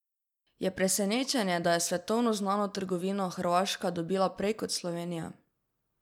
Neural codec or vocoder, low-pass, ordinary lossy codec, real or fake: none; 19.8 kHz; none; real